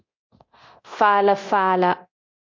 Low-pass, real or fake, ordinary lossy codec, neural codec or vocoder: 7.2 kHz; fake; MP3, 48 kbps; codec, 24 kHz, 0.9 kbps, DualCodec